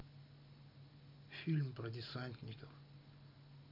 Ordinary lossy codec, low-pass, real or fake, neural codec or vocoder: none; 5.4 kHz; real; none